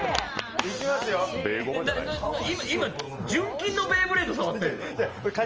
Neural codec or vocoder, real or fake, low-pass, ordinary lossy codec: none; real; 7.2 kHz; Opus, 24 kbps